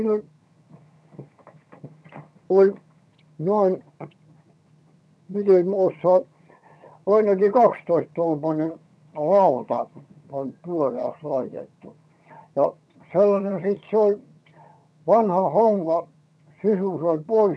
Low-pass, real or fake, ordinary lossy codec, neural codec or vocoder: none; fake; none; vocoder, 22.05 kHz, 80 mel bands, HiFi-GAN